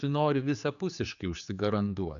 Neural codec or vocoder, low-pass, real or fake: codec, 16 kHz, 4 kbps, X-Codec, HuBERT features, trained on general audio; 7.2 kHz; fake